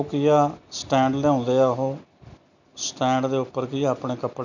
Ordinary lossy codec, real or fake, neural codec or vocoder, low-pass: none; real; none; 7.2 kHz